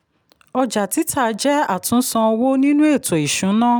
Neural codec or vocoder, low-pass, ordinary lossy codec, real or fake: none; none; none; real